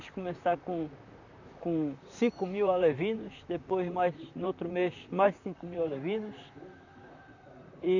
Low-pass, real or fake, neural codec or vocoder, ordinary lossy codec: 7.2 kHz; fake; vocoder, 44.1 kHz, 128 mel bands, Pupu-Vocoder; none